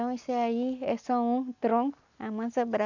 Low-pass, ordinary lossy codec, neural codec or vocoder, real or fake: 7.2 kHz; none; none; real